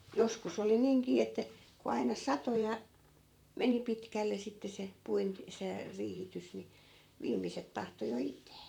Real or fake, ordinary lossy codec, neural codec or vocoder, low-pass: fake; none; vocoder, 44.1 kHz, 128 mel bands, Pupu-Vocoder; 19.8 kHz